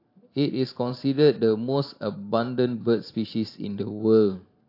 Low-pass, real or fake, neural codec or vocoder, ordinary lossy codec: 5.4 kHz; fake; vocoder, 44.1 kHz, 128 mel bands every 512 samples, BigVGAN v2; AAC, 32 kbps